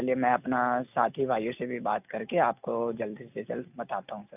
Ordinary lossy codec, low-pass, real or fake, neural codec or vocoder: none; 3.6 kHz; real; none